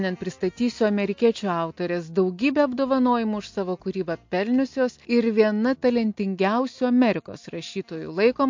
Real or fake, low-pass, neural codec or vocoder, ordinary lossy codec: real; 7.2 kHz; none; MP3, 48 kbps